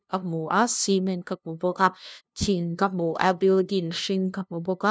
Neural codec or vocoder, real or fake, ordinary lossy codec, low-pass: codec, 16 kHz, 0.5 kbps, FunCodec, trained on LibriTTS, 25 frames a second; fake; none; none